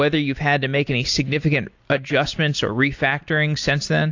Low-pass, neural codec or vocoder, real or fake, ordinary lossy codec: 7.2 kHz; none; real; AAC, 48 kbps